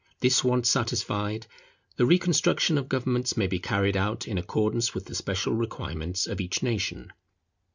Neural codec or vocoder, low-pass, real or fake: none; 7.2 kHz; real